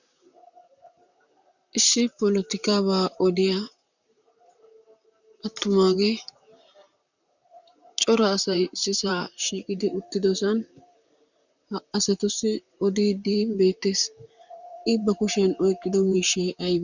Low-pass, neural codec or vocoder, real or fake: 7.2 kHz; vocoder, 44.1 kHz, 128 mel bands, Pupu-Vocoder; fake